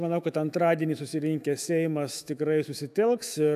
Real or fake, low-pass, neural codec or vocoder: fake; 14.4 kHz; autoencoder, 48 kHz, 128 numbers a frame, DAC-VAE, trained on Japanese speech